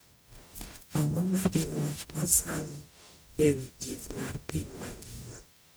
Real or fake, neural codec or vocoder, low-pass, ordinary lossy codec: fake; codec, 44.1 kHz, 0.9 kbps, DAC; none; none